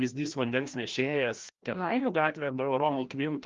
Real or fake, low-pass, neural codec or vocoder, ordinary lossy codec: fake; 7.2 kHz; codec, 16 kHz, 1 kbps, FreqCodec, larger model; Opus, 32 kbps